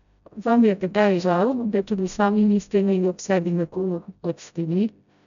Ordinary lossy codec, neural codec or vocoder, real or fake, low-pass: none; codec, 16 kHz, 0.5 kbps, FreqCodec, smaller model; fake; 7.2 kHz